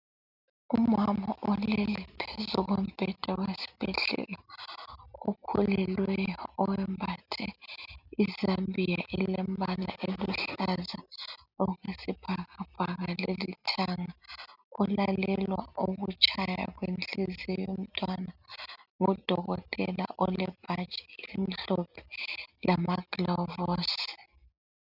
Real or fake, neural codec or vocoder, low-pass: real; none; 5.4 kHz